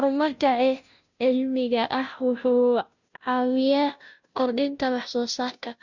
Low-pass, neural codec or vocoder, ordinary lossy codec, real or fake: 7.2 kHz; codec, 16 kHz, 0.5 kbps, FunCodec, trained on Chinese and English, 25 frames a second; none; fake